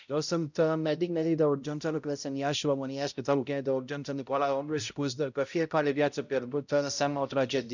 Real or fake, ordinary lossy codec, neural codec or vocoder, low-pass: fake; none; codec, 16 kHz, 0.5 kbps, X-Codec, HuBERT features, trained on balanced general audio; 7.2 kHz